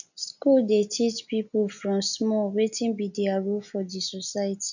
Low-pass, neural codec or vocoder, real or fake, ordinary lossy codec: 7.2 kHz; none; real; none